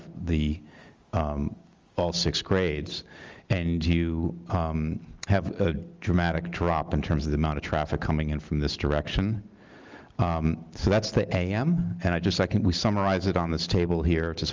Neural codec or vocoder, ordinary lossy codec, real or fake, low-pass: none; Opus, 32 kbps; real; 7.2 kHz